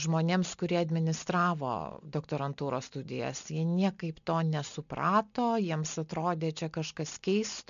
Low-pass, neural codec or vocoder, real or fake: 7.2 kHz; none; real